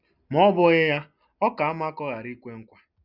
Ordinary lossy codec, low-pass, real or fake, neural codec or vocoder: none; 5.4 kHz; real; none